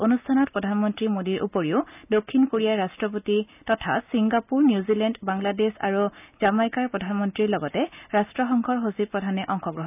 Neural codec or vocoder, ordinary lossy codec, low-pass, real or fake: none; none; 3.6 kHz; real